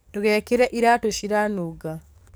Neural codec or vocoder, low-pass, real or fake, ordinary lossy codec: codec, 44.1 kHz, 7.8 kbps, DAC; none; fake; none